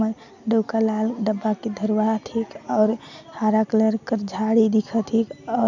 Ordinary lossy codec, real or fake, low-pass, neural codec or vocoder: none; real; 7.2 kHz; none